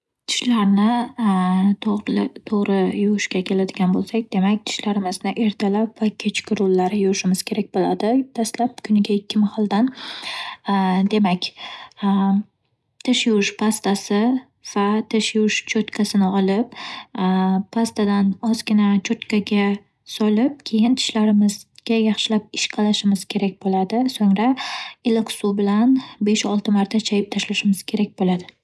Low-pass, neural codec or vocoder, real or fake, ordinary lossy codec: none; none; real; none